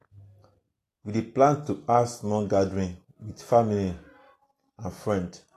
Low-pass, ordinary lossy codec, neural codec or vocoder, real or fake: 14.4 kHz; AAC, 48 kbps; none; real